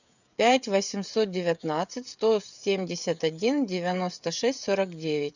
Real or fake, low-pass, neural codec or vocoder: fake; 7.2 kHz; codec, 16 kHz, 16 kbps, FreqCodec, smaller model